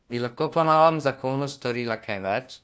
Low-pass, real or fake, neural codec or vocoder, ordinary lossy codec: none; fake; codec, 16 kHz, 1 kbps, FunCodec, trained on LibriTTS, 50 frames a second; none